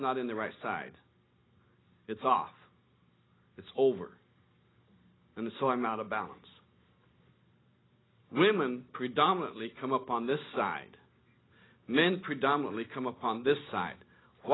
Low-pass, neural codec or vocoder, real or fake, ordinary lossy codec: 7.2 kHz; none; real; AAC, 16 kbps